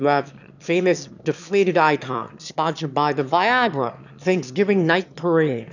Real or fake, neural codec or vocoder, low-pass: fake; autoencoder, 22.05 kHz, a latent of 192 numbers a frame, VITS, trained on one speaker; 7.2 kHz